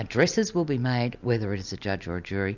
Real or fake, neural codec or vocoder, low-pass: real; none; 7.2 kHz